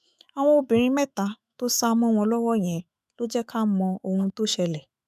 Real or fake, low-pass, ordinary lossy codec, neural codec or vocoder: fake; 14.4 kHz; none; autoencoder, 48 kHz, 128 numbers a frame, DAC-VAE, trained on Japanese speech